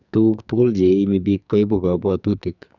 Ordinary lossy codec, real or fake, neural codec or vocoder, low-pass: none; fake; codec, 44.1 kHz, 2.6 kbps, SNAC; 7.2 kHz